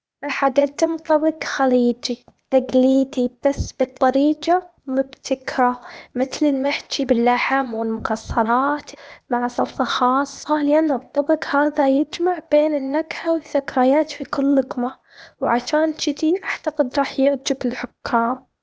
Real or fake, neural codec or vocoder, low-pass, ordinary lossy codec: fake; codec, 16 kHz, 0.8 kbps, ZipCodec; none; none